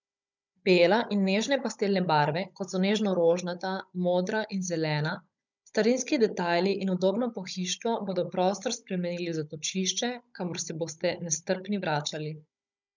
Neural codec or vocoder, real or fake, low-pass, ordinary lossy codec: codec, 16 kHz, 16 kbps, FunCodec, trained on Chinese and English, 50 frames a second; fake; 7.2 kHz; none